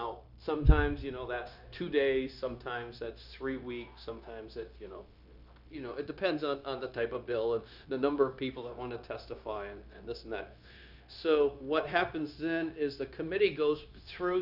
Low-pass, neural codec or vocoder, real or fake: 5.4 kHz; codec, 16 kHz, 0.9 kbps, LongCat-Audio-Codec; fake